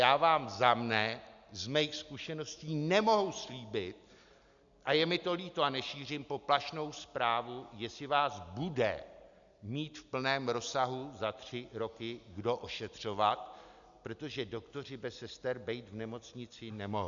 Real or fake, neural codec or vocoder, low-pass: real; none; 7.2 kHz